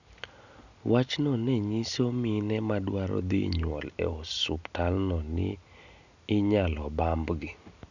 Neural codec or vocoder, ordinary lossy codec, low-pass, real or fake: none; none; 7.2 kHz; real